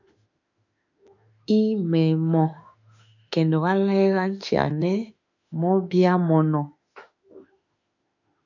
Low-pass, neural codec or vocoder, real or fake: 7.2 kHz; autoencoder, 48 kHz, 32 numbers a frame, DAC-VAE, trained on Japanese speech; fake